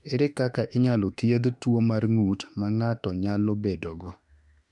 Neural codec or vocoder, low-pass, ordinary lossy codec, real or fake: autoencoder, 48 kHz, 32 numbers a frame, DAC-VAE, trained on Japanese speech; 10.8 kHz; MP3, 96 kbps; fake